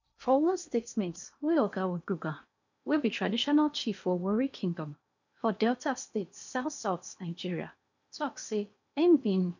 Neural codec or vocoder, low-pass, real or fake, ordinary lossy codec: codec, 16 kHz in and 24 kHz out, 0.8 kbps, FocalCodec, streaming, 65536 codes; 7.2 kHz; fake; none